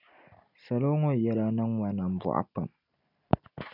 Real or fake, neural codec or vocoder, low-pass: real; none; 5.4 kHz